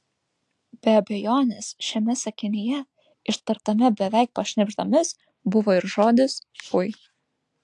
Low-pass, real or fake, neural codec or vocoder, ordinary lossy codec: 10.8 kHz; real; none; AAC, 64 kbps